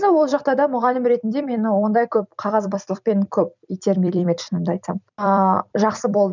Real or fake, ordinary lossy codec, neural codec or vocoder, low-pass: fake; none; vocoder, 44.1 kHz, 128 mel bands every 256 samples, BigVGAN v2; 7.2 kHz